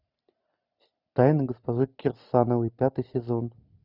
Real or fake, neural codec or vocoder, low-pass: real; none; 5.4 kHz